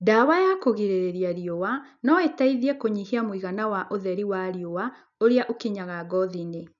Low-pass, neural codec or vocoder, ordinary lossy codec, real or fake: 7.2 kHz; none; none; real